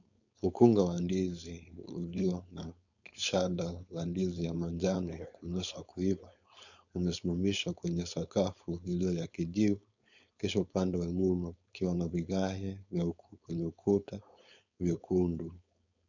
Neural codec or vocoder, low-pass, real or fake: codec, 16 kHz, 4.8 kbps, FACodec; 7.2 kHz; fake